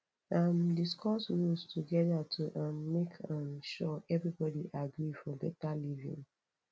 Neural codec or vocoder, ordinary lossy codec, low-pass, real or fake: none; none; none; real